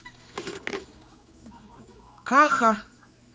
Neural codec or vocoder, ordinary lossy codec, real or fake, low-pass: codec, 16 kHz, 2 kbps, X-Codec, HuBERT features, trained on general audio; none; fake; none